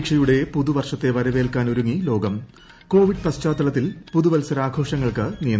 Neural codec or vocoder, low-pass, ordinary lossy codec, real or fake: none; none; none; real